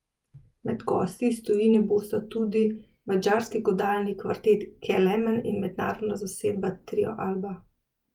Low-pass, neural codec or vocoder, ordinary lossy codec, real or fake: 19.8 kHz; vocoder, 48 kHz, 128 mel bands, Vocos; Opus, 32 kbps; fake